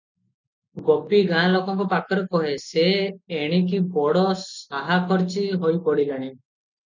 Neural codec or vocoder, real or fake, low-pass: none; real; 7.2 kHz